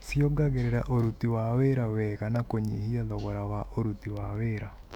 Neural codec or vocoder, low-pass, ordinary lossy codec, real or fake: autoencoder, 48 kHz, 128 numbers a frame, DAC-VAE, trained on Japanese speech; 19.8 kHz; none; fake